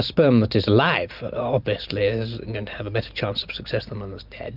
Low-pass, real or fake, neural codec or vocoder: 5.4 kHz; real; none